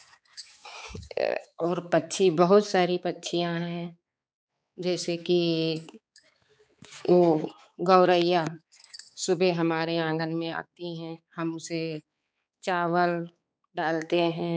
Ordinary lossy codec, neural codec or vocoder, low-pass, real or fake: none; codec, 16 kHz, 4 kbps, X-Codec, HuBERT features, trained on balanced general audio; none; fake